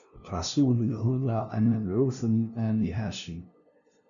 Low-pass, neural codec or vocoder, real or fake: 7.2 kHz; codec, 16 kHz, 0.5 kbps, FunCodec, trained on LibriTTS, 25 frames a second; fake